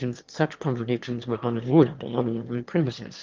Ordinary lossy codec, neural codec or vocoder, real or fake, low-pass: Opus, 32 kbps; autoencoder, 22.05 kHz, a latent of 192 numbers a frame, VITS, trained on one speaker; fake; 7.2 kHz